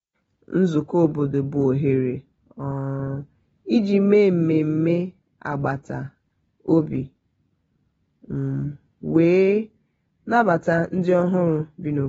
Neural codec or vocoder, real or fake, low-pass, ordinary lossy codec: none; real; 10.8 kHz; AAC, 24 kbps